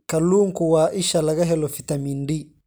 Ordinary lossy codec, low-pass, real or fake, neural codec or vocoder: none; none; real; none